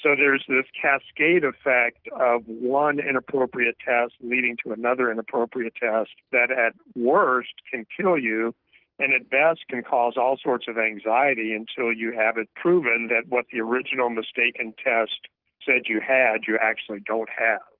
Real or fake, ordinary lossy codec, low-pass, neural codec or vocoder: real; Opus, 16 kbps; 5.4 kHz; none